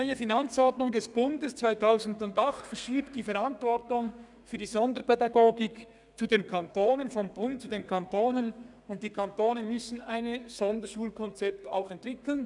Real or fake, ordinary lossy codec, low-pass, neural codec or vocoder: fake; none; 10.8 kHz; codec, 32 kHz, 1.9 kbps, SNAC